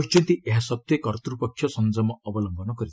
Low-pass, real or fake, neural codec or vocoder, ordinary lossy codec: none; real; none; none